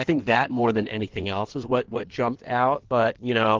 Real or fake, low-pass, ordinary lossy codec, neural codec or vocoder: fake; 7.2 kHz; Opus, 16 kbps; codec, 16 kHz in and 24 kHz out, 1.1 kbps, FireRedTTS-2 codec